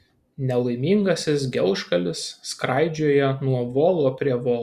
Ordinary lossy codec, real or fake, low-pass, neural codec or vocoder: Opus, 64 kbps; real; 14.4 kHz; none